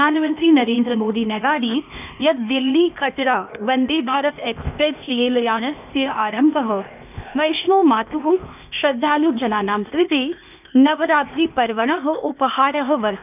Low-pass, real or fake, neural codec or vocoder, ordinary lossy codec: 3.6 kHz; fake; codec, 16 kHz, 0.8 kbps, ZipCodec; none